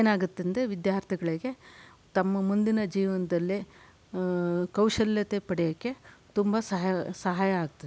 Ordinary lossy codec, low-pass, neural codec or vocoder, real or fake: none; none; none; real